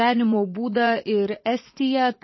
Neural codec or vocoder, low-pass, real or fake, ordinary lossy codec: vocoder, 44.1 kHz, 80 mel bands, Vocos; 7.2 kHz; fake; MP3, 24 kbps